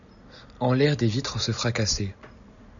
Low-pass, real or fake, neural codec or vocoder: 7.2 kHz; real; none